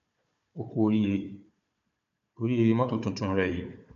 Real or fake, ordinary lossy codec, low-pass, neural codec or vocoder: fake; MP3, 64 kbps; 7.2 kHz; codec, 16 kHz, 4 kbps, FunCodec, trained on Chinese and English, 50 frames a second